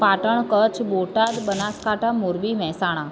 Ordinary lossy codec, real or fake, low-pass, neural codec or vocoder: none; real; none; none